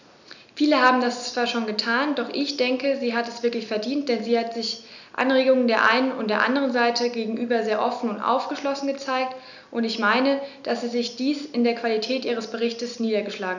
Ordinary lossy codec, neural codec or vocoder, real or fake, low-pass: none; none; real; 7.2 kHz